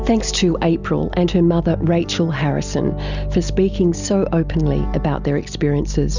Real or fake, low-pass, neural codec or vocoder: real; 7.2 kHz; none